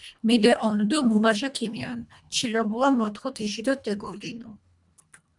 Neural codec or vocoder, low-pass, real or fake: codec, 24 kHz, 1.5 kbps, HILCodec; 10.8 kHz; fake